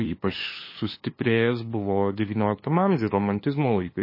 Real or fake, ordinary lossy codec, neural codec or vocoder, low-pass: fake; MP3, 24 kbps; codec, 16 kHz, 2 kbps, FunCodec, trained on LibriTTS, 25 frames a second; 5.4 kHz